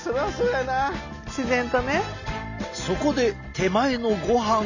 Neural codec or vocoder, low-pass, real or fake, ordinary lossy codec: none; 7.2 kHz; real; none